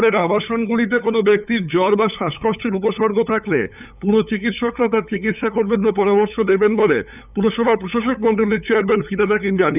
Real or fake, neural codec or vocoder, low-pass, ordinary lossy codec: fake; codec, 16 kHz, 8 kbps, FunCodec, trained on LibriTTS, 25 frames a second; 3.6 kHz; none